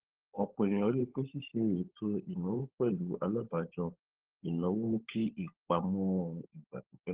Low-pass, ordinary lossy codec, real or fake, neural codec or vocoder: 3.6 kHz; Opus, 16 kbps; fake; codec, 16 kHz, 16 kbps, FunCodec, trained on LibriTTS, 50 frames a second